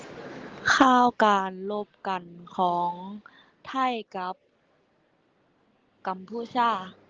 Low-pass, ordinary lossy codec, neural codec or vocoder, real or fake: 7.2 kHz; Opus, 16 kbps; none; real